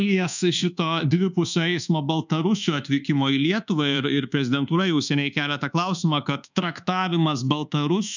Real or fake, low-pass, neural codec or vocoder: fake; 7.2 kHz; codec, 24 kHz, 1.2 kbps, DualCodec